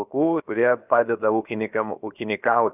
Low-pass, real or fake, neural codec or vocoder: 3.6 kHz; fake; codec, 16 kHz, 0.7 kbps, FocalCodec